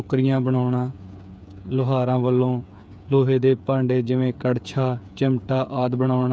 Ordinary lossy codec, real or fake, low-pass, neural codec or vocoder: none; fake; none; codec, 16 kHz, 8 kbps, FreqCodec, smaller model